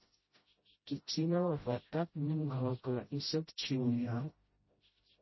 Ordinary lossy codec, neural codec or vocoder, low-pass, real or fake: MP3, 24 kbps; codec, 16 kHz, 0.5 kbps, FreqCodec, smaller model; 7.2 kHz; fake